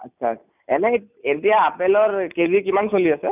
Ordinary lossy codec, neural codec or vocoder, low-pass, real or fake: none; none; 3.6 kHz; real